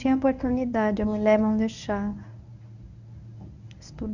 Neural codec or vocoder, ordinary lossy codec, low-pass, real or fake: codec, 24 kHz, 0.9 kbps, WavTokenizer, medium speech release version 1; none; 7.2 kHz; fake